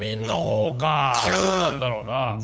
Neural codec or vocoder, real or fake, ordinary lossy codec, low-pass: codec, 16 kHz, 8 kbps, FunCodec, trained on LibriTTS, 25 frames a second; fake; none; none